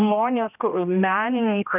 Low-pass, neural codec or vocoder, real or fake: 3.6 kHz; codec, 16 kHz, 1 kbps, X-Codec, HuBERT features, trained on general audio; fake